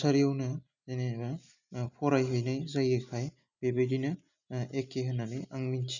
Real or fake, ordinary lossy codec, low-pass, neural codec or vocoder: real; none; 7.2 kHz; none